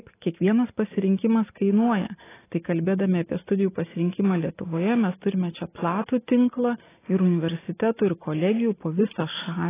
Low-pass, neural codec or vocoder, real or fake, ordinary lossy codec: 3.6 kHz; none; real; AAC, 16 kbps